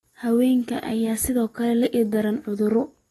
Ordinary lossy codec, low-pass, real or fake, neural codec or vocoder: AAC, 32 kbps; 19.8 kHz; real; none